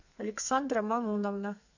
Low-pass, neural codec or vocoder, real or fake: 7.2 kHz; codec, 32 kHz, 1.9 kbps, SNAC; fake